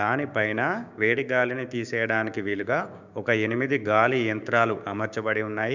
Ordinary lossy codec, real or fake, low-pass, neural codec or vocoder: none; fake; 7.2 kHz; codec, 16 kHz, 6 kbps, DAC